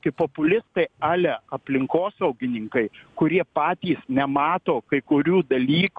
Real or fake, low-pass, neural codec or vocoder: real; 9.9 kHz; none